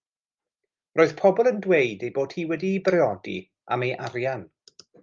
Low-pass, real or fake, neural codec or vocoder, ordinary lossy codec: 7.2 kHz; real; none; Opus, 32 kbps